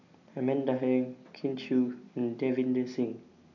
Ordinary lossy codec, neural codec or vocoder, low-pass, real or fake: none; none; 7.2 kHz; real